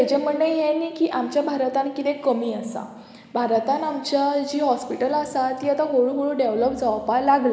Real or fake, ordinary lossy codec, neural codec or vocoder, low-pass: real; none; none; none